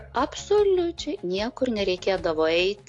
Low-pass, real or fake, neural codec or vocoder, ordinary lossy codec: 10.8 kHz; real; none; AAC, 48 kbps